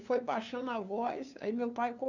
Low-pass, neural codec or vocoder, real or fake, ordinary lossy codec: 7.2 kHz; codec, 16 kHz, 2 kbps, FunCodec, trained on LibriTTS, 25 frames a second; fake; none